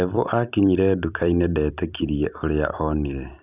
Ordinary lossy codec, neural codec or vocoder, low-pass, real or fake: none; vocoder, 44.1 kHz, 128 mel bands every 256 samples, BigVGAN v2; 3.6 kHz; fake